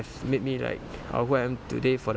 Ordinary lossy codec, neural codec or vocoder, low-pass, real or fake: none; none; none; real